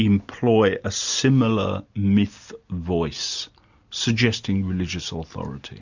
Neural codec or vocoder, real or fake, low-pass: none; real; 7.2 kHz